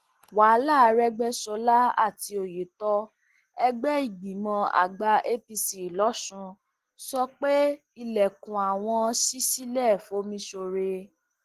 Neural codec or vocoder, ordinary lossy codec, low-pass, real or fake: none; Opus, 16 kbps; 14.4 kHz; real